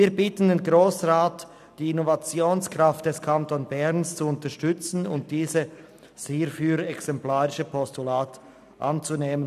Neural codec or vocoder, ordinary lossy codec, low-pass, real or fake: none; none; 14.4 kHz; real